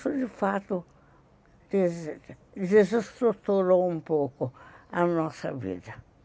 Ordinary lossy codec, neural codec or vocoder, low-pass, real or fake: none; none; none; real